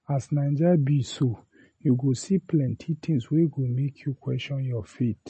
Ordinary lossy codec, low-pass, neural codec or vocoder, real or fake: MP3, 32 kbps; 10.8 kHz; none; real